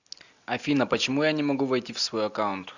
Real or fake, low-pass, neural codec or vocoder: real; 7.2 kHz; none